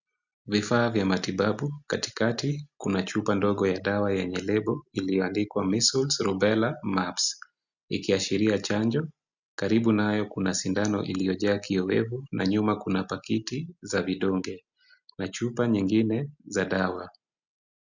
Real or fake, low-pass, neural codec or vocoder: real; 7.2 kHz; none